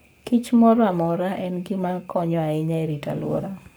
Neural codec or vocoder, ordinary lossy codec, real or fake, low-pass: codec, 44.1 kHz, 7.8 kbps, Pupu-Codec; none; fake; none